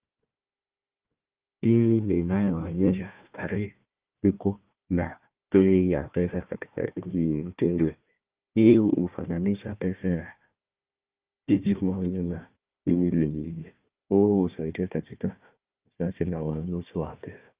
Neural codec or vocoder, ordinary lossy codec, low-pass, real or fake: codec, 16 kHz, 1 kbps, FunCodec, trained on Chinese and English, 50 frames a second; Opus, 24 kbps; 3.6 kHz; fake